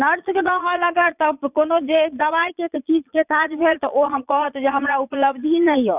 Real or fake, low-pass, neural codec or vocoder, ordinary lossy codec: fake; 3.6 kHz; vocoder, 22.05 kHz, 80 mel bands, Vocos; none